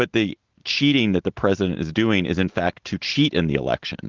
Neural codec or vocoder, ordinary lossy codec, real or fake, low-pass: none; Opus, 16 kbps; real; 7.2 kHz